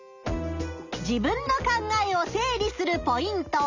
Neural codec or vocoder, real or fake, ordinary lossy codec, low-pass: none; real; none; 7.2 kHz